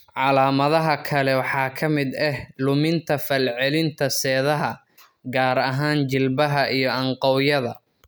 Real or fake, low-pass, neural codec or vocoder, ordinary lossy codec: real; none; none; none